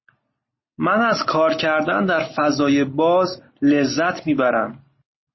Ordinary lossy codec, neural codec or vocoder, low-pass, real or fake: MP3, 24 kbps; none; 7.2 kHz; real